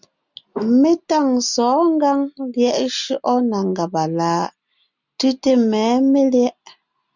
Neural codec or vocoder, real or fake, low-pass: none; real; 7.2 kHz